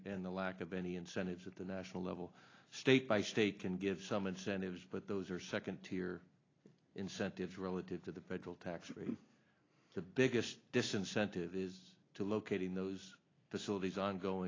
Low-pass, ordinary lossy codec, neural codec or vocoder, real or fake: 7.2 kHz; AAC, 32 kbps; none; real